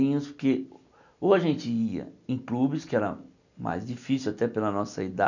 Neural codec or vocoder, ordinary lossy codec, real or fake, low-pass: none; none; real; 7.2 kHz